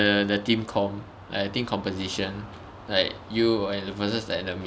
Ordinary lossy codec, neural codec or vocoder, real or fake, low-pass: none; none; real; none